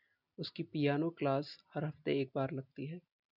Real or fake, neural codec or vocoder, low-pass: real; none; 5.4 kHz